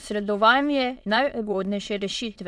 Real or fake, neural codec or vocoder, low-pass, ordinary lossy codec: fake; autoencoder, 22.05 kHz, a latent of 192 numbers a frame, VITS, trained on many speakers; none; none